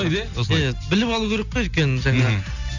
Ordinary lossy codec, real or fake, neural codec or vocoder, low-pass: none; real; none; 7.2 kHz